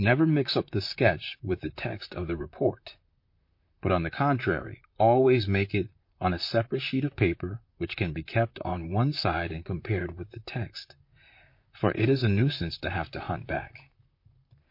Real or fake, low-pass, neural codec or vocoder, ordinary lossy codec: fake; 5.4 kHz; vocoder, 44.1 kHz, 128 mel bands, Pupu-Vocoder; MP3, 32 kbps